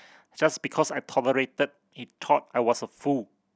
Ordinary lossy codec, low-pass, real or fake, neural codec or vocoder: none; none; real; none